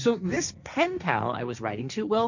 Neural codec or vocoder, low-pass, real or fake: codec, 16 kHz, 1.1 kbps, Voila-Tokenizer; 7.2 kHz; fake